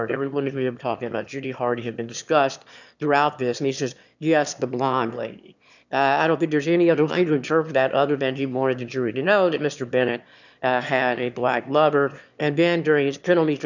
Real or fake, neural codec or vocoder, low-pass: fake; autoencoder, 22.05 kHz, a latent of 192 numbers a frame, VITS, trained on one speaker; 7.2 kHz